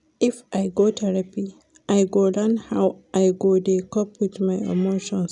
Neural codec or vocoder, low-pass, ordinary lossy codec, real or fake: none; 10.8 kHz; none; real